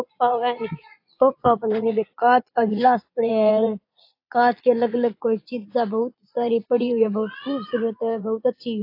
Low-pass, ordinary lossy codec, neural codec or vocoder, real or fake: 5.4 kHz; AAC, 32 kbps; vocoder, 22.05 kHz, 80 mel bands, Vocos; fake